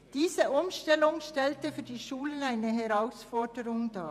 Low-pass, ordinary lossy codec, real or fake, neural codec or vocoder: 14.4 kHz; none; fake; vocoder, 48 kHz, 128 mel bands, Vocos